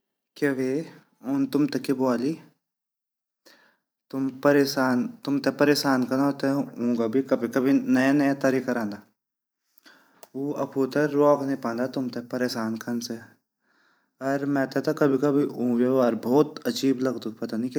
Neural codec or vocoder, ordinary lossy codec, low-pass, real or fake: vocoder, 44.1 kHz, 128 mel bands every 512 samples, BigVGAN v2; none; none; fake